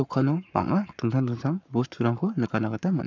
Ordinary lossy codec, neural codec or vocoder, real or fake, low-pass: none; codec, 44.1 kHz, 7.8 kbps, Pupu-Codec; fake; 7.2 kHz